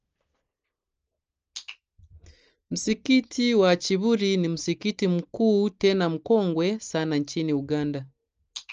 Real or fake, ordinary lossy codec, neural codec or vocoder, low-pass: real; Opus, 24 kbps; none; 7.2 kHz